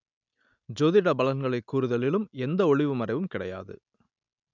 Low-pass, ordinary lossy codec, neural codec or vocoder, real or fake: 7.2 kHz; none; none; real